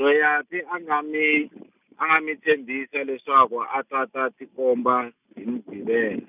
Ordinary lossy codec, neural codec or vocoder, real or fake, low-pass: none; none; real; 3.6 kHz